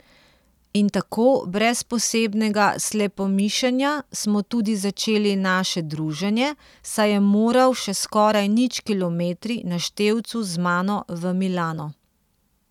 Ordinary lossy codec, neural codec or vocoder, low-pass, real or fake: none; none; 19.8 kHz; real